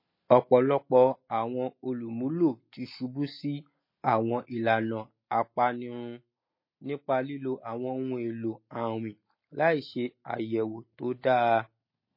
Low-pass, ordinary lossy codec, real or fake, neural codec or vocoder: 5.4 kHz; MP3, 24 kbps; real; none